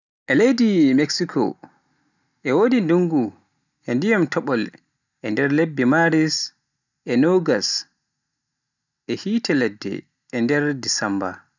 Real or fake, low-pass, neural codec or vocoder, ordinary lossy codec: real; 7.2 kHz; none; none